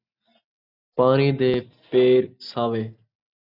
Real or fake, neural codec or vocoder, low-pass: real; none; 5.4 kHz